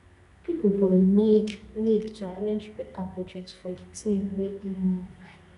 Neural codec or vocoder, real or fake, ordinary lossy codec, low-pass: codec, 24 kHz, 0.9 kbps, WavTokenizer, medium music audio release; fake; none; 10.8 kHz